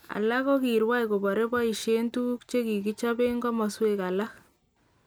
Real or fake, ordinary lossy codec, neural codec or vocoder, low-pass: real; none; none; none